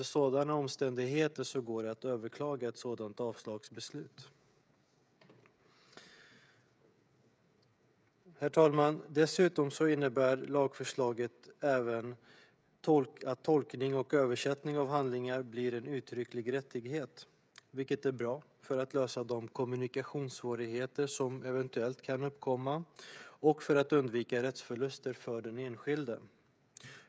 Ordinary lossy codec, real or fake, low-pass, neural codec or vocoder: none; fake; none; codec, 16 kHz, 16 kbps, FreqCodec, smaller model